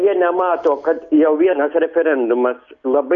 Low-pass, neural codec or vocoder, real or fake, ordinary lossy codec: 7.2 kHz; none; real; Opus, 64 kbps